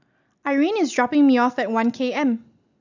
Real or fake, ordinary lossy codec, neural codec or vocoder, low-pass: real; none; none; 7.2 kHz